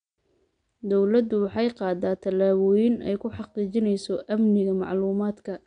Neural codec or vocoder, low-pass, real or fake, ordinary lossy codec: none; 9.9 kHz; real; none